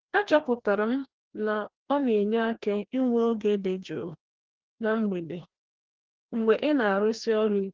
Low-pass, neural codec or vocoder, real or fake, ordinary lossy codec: 7.2 kHz; codec, 16 kHz, 1 kbps, FreqCodec, larger model; fake; Opus, 16 kbps